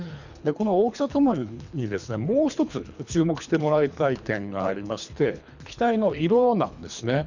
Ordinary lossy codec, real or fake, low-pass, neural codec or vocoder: none; fake; 7.2 kHz; codec, 24 kHz, 3 kbps, HILCodec